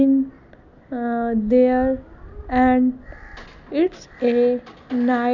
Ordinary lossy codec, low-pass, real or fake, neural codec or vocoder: none; 7.2 kHz; real; none